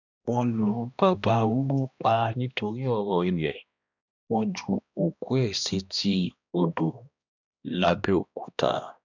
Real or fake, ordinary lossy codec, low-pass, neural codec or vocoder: fake; none; 7.2 kHz; codec, 16 kHz, 1 kbps, X-Codec, HuBERT features, trained on balanced general audio